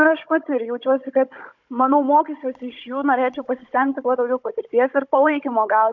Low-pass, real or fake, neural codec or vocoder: 7.2 kHz; fake; codec, 16 kHz, 16 kbps, FunCodec, trained on Chinese and English, 50 frames a second